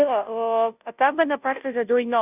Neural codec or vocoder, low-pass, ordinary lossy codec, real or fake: codec, 16 kHz, 0.5 kbps, FunCodec, trained on Chinese and English, 25 frames a second; 3.6 kHz; none; fake